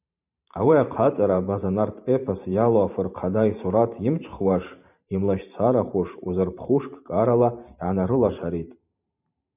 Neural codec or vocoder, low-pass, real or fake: vocoder, 44.1 kHz, 128 mel bands every 512 samples, BigVGAN v2; 3.6 kHz; fake